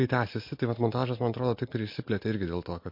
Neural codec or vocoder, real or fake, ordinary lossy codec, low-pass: none; real; MP3, 32 kbps; 5.4 kHz